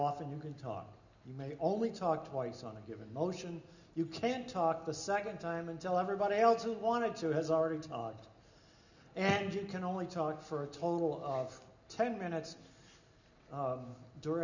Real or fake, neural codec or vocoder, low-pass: real; none; 7.2 kHz